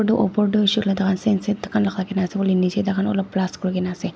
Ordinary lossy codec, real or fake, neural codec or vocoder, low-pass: none; real; none; none